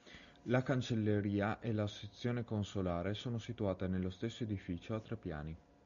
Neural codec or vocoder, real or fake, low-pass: none; real; 7.2 kHz